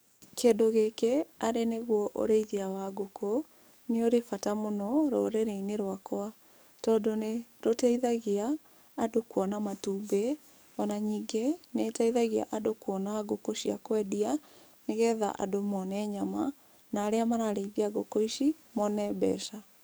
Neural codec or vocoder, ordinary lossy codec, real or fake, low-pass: codec, 44.1 kHz, 7.8 kbps, DAC; none; fake; none